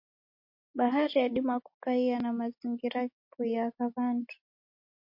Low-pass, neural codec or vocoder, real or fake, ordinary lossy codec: 5.4 kHz; none; real; MP3, 32 kbps